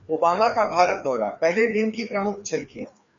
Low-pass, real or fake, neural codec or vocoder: 7.2 kHz; fake; codec, 16 kHz, 2 kbps, FreqCodec, larger model